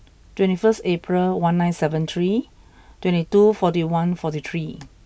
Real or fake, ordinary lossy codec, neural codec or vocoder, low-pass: real; none; none; none